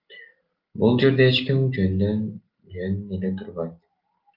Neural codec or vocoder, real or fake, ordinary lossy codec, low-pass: none; real; Opus, 32 kbps; 5.4 kHz